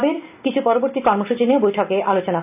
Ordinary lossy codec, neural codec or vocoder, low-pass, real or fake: none; none; 3.6 kHz; real